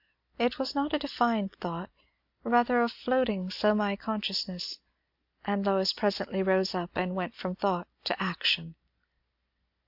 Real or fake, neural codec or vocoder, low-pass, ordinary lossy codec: real; none; 5.4 kHz; AAC, 48 kbps